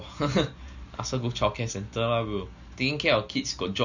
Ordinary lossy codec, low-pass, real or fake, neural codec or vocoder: none; 7.2 kHz; real; none